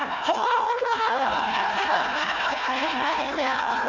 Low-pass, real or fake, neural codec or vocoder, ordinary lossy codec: 7.2 kHz; fake; codec, 16 kHz, 1 kbps, FunCodec, trained on Chinese and English, 50 frames a second; none